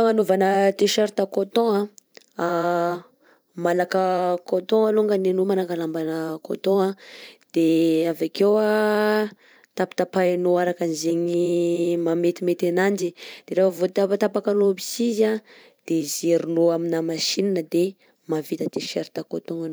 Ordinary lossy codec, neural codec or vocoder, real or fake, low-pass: none; vocoder, 44.1 kHz, 128 mel bands every 512 samples, BigVGAN v2; fake; none